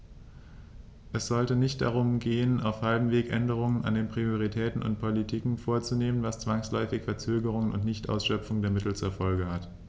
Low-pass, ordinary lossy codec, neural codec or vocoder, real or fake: none; none; none; real